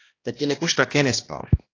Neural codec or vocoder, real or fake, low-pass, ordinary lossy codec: codec, 16 kHz, 1 kbps, X-Codec, HuBERT features, trained on balanced general audio; fake; 7.2 kHz; AAC, 32 kbps